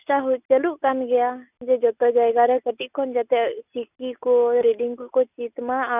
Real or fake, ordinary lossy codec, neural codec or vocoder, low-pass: real; none; none; 3.6 kHz